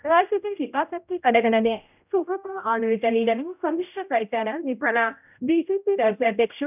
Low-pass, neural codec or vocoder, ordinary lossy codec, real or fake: 3.6 kHz; codec, 16 kHz, 0.5 kbps, X-Codec, HuBERT features, trained on general audio; none; fake